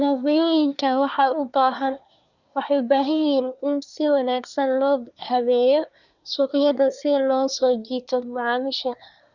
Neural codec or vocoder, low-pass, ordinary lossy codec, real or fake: codec, 24 kHz, 1 kbps, SNAC; 7.2 kHz; none; fake